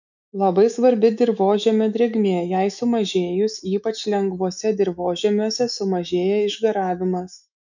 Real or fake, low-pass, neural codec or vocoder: fake; 7.2 kHz; autoencoder, 48 kHz, 128 numbers a frame, DAC-VAE, trained on Japanese speech